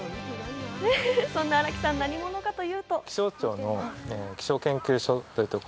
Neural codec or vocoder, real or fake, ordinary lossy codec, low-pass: none; real; none; none